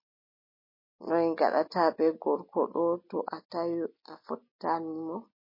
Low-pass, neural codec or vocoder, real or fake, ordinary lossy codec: 5.4 kHz; none; real; MP3, 24 kbps